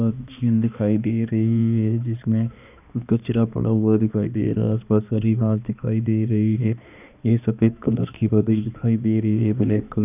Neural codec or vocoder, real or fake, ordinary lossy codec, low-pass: codec, 16 kHz, 2 kbps, X-Codec, HuBERT features, trained on balanced general audio; fake; none; 3.6 kHz